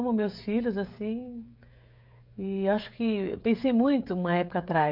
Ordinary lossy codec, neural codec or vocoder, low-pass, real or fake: AAC, 48 kbps; codec, 16 kHz, 16 kbps, FreqCodec, smaller model; 5.4 kHz; fake